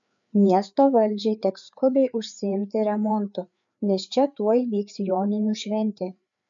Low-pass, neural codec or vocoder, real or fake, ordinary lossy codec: 7.2 kHz; codec, 16 kHz, 4 kbps, FreqCodec, larger model; fake; MP3, 64 kbps